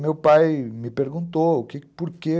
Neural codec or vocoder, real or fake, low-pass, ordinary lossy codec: none; real; none; none